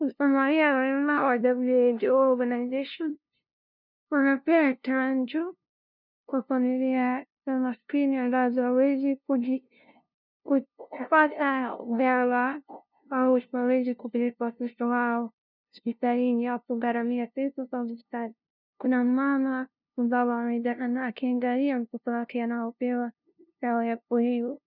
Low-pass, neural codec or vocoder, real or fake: 5.4 kHz; codec, 16 kHz, 0.5 kbps, FunCodec, trained on LibriTTS, 25 frames a second; fake